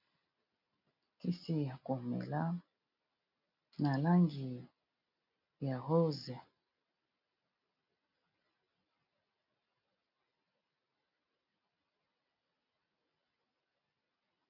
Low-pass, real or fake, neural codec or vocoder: 5.4 kHz; real; none